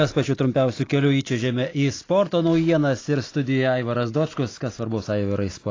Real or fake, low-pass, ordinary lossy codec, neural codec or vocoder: real; 7.2 kHz; AAC, 32 kbps; none